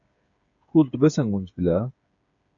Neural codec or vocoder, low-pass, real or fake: codec, 16 kHz, 8 kbps, FreqCodec, smaller model; 7.2 kHz; fake